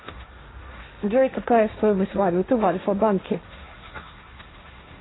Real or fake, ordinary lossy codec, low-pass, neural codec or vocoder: fake; AAC, 16 kbps; 7.2 kHz; codec, 16 kHz, 1.1 kbps, Voila-Tokenizer